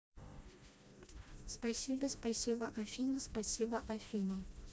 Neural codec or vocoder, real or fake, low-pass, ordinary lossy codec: codec, 16 kHz, 1 kbps, FreqCodec, smaller model; fake; none; none